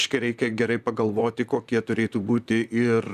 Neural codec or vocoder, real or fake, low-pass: vocoder, 44.1 kHz, 128 mel bands, Pupu-Vocoder; fake; 14.4 kHz